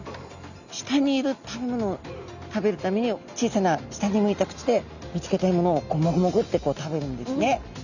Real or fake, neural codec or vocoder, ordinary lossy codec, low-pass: real; none; none; 7.2 kHz